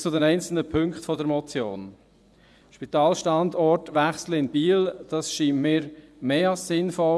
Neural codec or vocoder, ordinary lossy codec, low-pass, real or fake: vocoder, 24 kHz, 100 mel bands, Vocos; none; none; fake